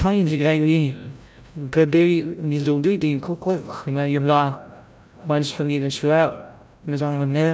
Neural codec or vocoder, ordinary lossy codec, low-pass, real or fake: codec, 16 kHz, 0.5 kbps, FreqCodec, larger model; none; none; fake